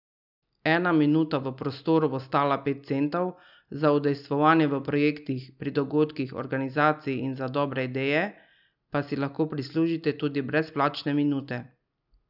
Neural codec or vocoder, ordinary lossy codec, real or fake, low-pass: none; none; real; 5.4 kHz